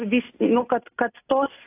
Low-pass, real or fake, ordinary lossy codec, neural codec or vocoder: 3.6 kHz; real; AAC, 24 kbps; none